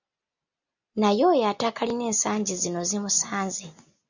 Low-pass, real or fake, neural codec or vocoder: 7.2 kHz; real; none